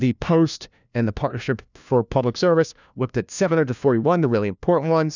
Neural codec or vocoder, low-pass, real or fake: codec, 16 kHz, 1 kbps, FunCodec, trained on LibriTTS, 50 frames a second; 7.2 kHz; fake